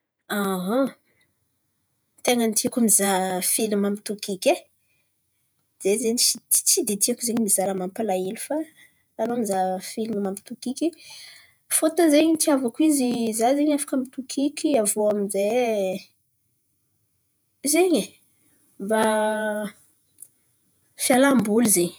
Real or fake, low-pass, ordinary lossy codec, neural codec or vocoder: fake; none; none; vocoder, 48 kHz, 128 mel bands, Vocos